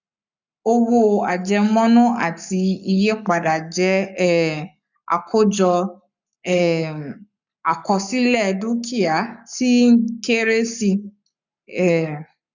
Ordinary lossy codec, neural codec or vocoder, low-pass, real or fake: none; codec, 44.1 kHz, 7.8 kbps, Pupu-Codec; 7.2 kHz; fake